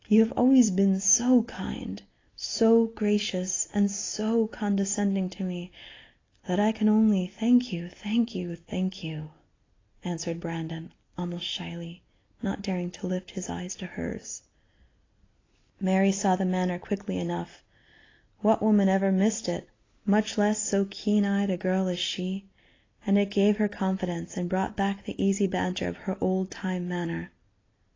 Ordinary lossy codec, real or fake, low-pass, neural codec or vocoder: AAC, 32 kbps; real; 7.2 kHz; none